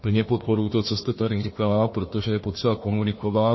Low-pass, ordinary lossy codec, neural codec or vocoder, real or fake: 7.2 kHz; MP3, 24 kbps; codec, 16 kHz, 1 kbps, FunCodec, trained on Chinese and English, 50 frames a second; fake